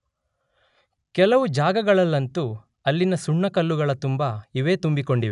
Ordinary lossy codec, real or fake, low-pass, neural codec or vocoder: none; real; 10.8 kHz; none